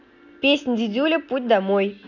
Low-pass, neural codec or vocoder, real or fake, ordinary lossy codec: 7.2 kHz; none; real; none